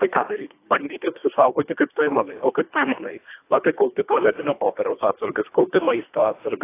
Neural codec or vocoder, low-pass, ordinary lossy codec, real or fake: codec, 24 kHz, 1.5 kbps, HILCodec; 3.6 kHz; AAC, 24 kbps; fake